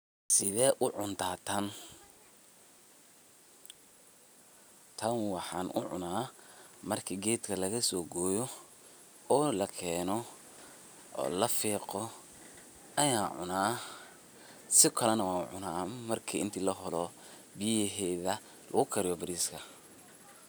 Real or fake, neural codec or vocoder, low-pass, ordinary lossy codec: real; none; none; none